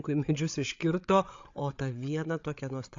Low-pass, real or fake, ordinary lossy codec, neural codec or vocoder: 7.2 kHz; fake; AAC, 48 kbps; codec, 16 kHz, 8 kbps, FreqCodec, larger model